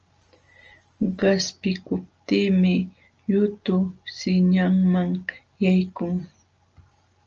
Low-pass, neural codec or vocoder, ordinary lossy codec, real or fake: 7.2 kHz; none; Opus, 24 kbps; real